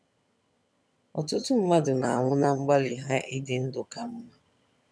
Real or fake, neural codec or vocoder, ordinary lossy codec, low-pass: fake; vocoder, 22.05 kHz, 80 mel bands, HiFi-GAN; none; none